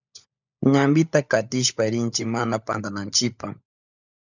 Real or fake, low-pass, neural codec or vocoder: fake; 7.2 kHz; codec, 16 kHz, 16 kbps, FunCodec, trained on LibriTTS, 50 frames a second